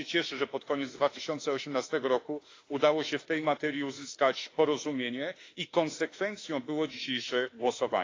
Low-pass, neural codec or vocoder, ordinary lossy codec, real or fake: 7.2 kHz; autoencoder, 48 kHz, 32 numbers a frame, DAC-VAE, trained on Japanese speech; AAC, 32 kbps; fake